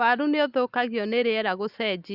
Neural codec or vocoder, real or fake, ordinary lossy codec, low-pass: none; real; Opus, 64 kbps; 5.4 kHz